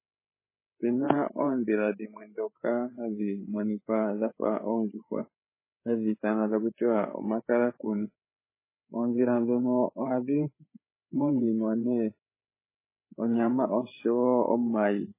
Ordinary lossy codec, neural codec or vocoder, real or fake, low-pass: MP3, 16 kbps; codec, 16 kHz, 8 kbps, FreqCodec, larger model; fake; 3.6 kHz